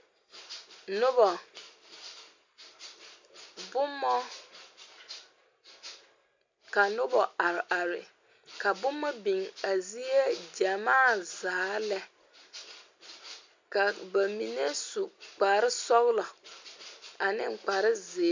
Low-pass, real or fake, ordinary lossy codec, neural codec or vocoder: 7.2 kHz; real; MP3, 64 kbps; none